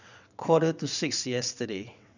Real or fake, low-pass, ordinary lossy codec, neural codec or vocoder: fake; 7.2 kHz; none; vocoder, 22.05 kHz, 80 mel bands, WaveNeXt